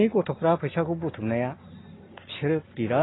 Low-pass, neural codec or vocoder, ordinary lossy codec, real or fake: 7.2 kHz; none; AAC, 16 kbps; real